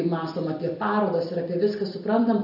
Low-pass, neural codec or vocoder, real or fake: 5.4 kHz; none; real